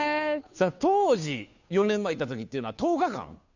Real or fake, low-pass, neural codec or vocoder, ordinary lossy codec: fake; 7.2 kHz; codec, 16 kHz, 2 kbps, FunCodec, trained on Chinese and English, 25 frames a second; none